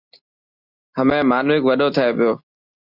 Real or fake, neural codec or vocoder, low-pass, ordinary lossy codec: real; none; 5.4 kHz; Opus, 64 kbps